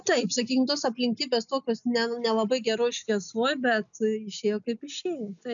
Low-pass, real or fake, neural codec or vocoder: 7.2 kHz; real; none